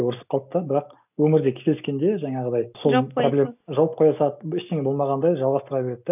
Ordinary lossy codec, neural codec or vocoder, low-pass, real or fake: none; none; 3.6 kHz; real